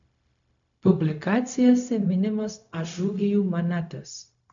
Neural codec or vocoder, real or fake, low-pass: codec, 16 kHz, 0.4 kbps, LongCat-Audio-Codec; fake; 7.2 kHz